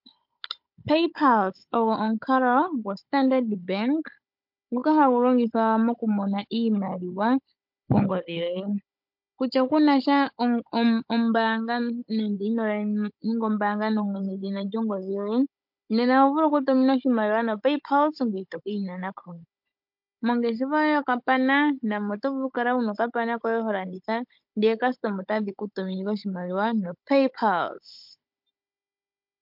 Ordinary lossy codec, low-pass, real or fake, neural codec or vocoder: MP3, 48 kbps; 5.4 kHz; fake; codec, 16 kHz, 16 kbps, FunCodec, trained on Chinese and English, 50 frames a second